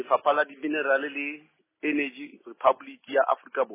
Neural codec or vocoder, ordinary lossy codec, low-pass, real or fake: none; MP3, 16 kbps; 3.6 kHz; real